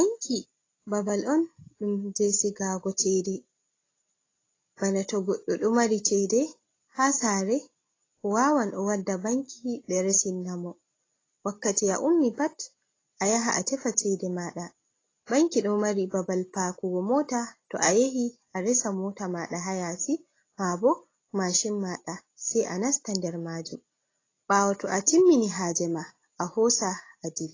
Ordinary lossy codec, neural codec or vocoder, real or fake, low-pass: AAC, 32 kbps; none; real; 7.2 kHz